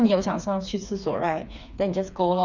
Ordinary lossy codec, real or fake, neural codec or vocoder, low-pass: none; fake; codec, 16 kHz, 4 kbps, FreqCodec, smaller model; 7.2 kHz